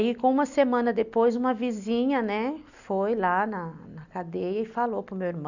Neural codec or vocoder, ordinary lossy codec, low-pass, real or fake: none; none; 7.2 kHz; real